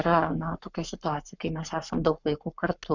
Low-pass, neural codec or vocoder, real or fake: 7.2 kHz; codec, 44.1 kHz, 7.8 kbps, Pupu-Codec; fake